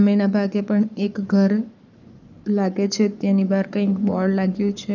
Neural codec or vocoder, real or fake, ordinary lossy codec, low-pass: codec, 44.1 kHz, 7.8 kbps, Pupu-Codec; fake; none; 7.2 kHz